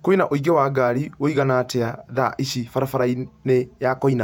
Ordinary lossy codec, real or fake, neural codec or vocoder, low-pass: none; fake; vocoder, 48 kHz, 128 mel bands, Vocos; 19.8 kHz